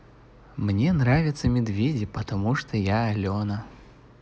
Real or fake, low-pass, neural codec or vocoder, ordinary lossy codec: real; none; none; none